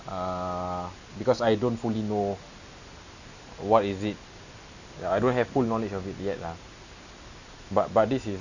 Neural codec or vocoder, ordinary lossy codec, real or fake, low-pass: none; none; real; 7.2 kHz